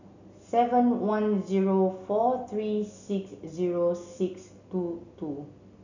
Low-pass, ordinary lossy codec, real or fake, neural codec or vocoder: 7.2 kHz; none; real; none